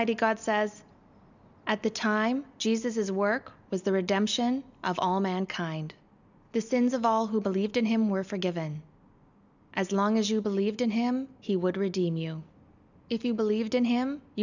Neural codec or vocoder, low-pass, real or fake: none; 7.2 kHz; real